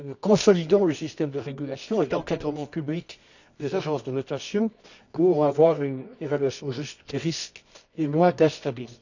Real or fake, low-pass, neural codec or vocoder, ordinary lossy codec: fake; 7.2 kHz; codec, 24 kHz, 0.9 kbps, WavTokenizer, medium music audio release; none